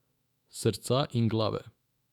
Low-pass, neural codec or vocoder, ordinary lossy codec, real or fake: 19.8 kHz; autoencoder, 48 kHz, 128 numbers a frame, DAC-VAE, trained on Japanese speech; none; fake